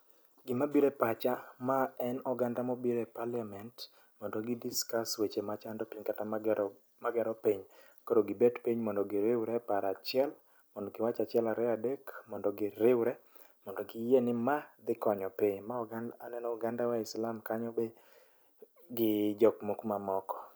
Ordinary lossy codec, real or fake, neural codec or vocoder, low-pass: none; real; none; none